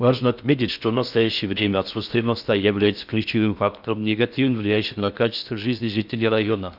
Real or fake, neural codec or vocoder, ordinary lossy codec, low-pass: fake; codec, 16 kHz in and 24 kHz out, 0.6 kbps, FocalCodec, streaming, 4096 codes; none; 5.4 kHz